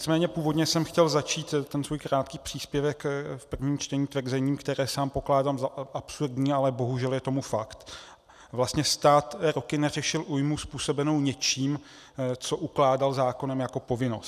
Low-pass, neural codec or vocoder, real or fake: 14.4 kHz; none; real